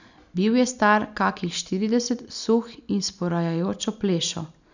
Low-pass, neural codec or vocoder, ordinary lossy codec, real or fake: 7.2 kHz; none; none; real